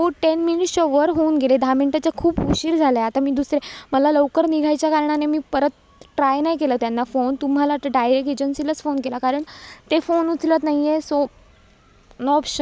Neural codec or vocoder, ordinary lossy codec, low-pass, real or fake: none; none; none; real